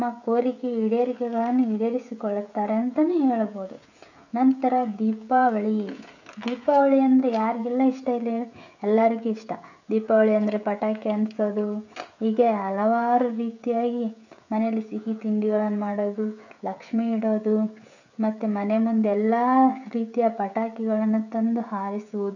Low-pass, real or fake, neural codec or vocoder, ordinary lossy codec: 7.2 kHz; fake; codec, 16 kHz, 16 kbps, FreqCodec, smaller model; none